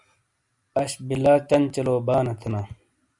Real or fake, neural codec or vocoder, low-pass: real; none; 10.8 kHz